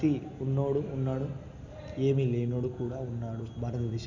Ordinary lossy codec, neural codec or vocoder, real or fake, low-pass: none; none; real; 7.2 kHz